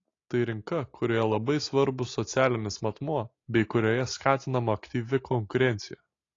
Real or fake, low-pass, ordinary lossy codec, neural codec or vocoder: real; 7.2 kHz; AAC, 32 kbps; none